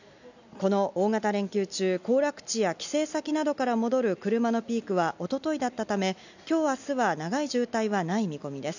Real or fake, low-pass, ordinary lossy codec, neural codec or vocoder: real; 7.2 kHz; none; none